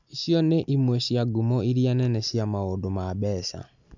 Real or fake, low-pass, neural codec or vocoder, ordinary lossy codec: real; 7.2 kHz; none; none